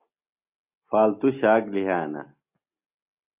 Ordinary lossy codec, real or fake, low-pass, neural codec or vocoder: Opus, 64 kbps; real; 3.6 kHz; none